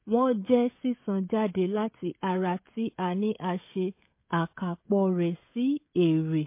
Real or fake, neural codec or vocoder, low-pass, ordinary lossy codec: fake; codec, 16 kHz, 16 kbps, FreqCodec, smaller model; 3.6 kHz; MP3, 24 kbps